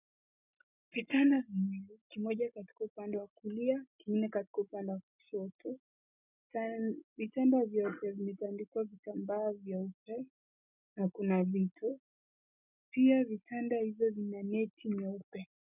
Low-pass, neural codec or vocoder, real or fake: 3.6 kHz; none; real